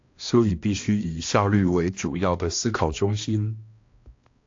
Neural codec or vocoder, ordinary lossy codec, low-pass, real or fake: codec, 16 kHz, 1 kbps, X-Codec, HuBERT features, trained on balanced general audio; AAC, 48 kbps; 7.2 kHz; fake